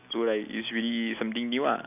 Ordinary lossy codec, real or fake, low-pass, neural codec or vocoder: none; real; 3.6 kHz; none